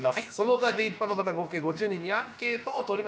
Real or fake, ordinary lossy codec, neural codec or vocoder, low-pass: fake; none; codec, 16 kHz, about 1 kbps, DyCAST, with the encoder's durations; none